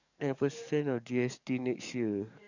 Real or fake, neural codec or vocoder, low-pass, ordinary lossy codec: fake; codec, 44.1 kHz, 7.8 kbps, DAC; 7.2 kHz; none